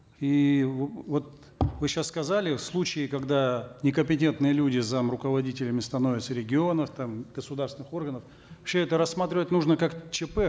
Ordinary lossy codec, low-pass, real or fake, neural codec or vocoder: none; none; real; none